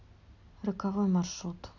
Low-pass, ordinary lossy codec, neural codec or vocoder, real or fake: 7.2 kHz; none; none; real